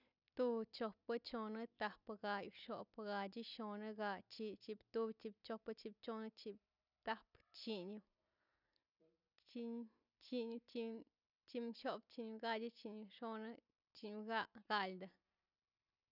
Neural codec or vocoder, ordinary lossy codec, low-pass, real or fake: none; none; 5.4 kHz; real